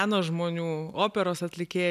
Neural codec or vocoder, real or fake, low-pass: none; real; 14.4 kHz